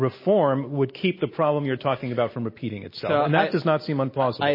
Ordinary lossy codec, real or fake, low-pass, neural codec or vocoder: MP3, 24 kbps; real; 5.4 kHz; none